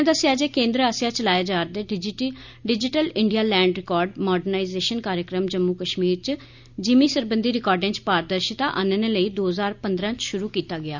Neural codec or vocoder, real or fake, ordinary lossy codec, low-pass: none; real; none; 7.2 kHz